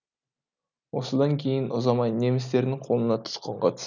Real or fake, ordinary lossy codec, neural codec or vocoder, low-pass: real; none; none; 7.2 kHz